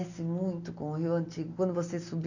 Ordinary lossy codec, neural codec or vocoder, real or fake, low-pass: none; none; real; 7.2 kHz